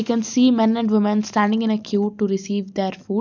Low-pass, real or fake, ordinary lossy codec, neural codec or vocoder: 7.2 kHz; real; none; none